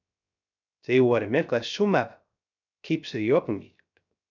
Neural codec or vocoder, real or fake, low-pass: codec, 16 kHz, 0.3 kbps, FocalCodec; fake; 7.2 kHz